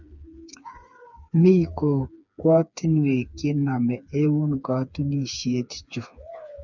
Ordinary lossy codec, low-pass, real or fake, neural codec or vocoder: none; 7.2 kHz; fake; codec, 16 kHz, 4 kbps, FreqCodec, smaller model